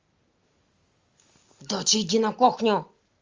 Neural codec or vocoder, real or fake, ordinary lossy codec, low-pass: none; real; Opus, 32 kbps; 7.2 kHz